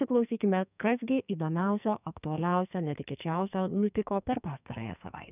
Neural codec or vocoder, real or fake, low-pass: codec, 44.1 kHz, 2.6 kbps, SNAC; fake; 3.6 kHz